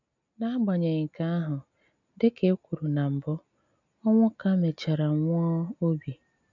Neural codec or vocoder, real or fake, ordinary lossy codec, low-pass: none; real; none; 7.2 kHz